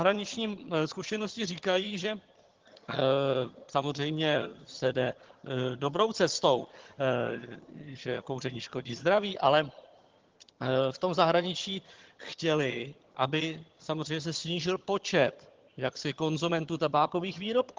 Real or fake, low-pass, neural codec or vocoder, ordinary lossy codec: fake; 7.2 kHz; vocoder, 22.05 kHz, 80 mel bands, HiFi-GAN; Opus, 16 kbps